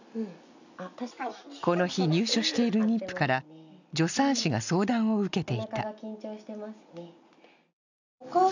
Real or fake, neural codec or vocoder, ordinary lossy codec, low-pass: real; none; none; 7.2 kHz